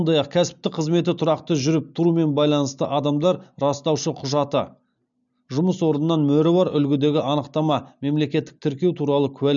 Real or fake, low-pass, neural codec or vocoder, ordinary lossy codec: real; 7.2 kHz; none; none